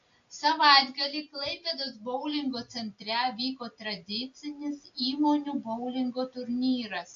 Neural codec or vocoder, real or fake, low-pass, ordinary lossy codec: none; real; 7.2 kHz; AAC, 48 kbps